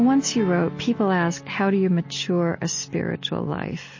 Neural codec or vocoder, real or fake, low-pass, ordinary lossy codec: none; real; 7.2 kHz; MP3, 32 kbps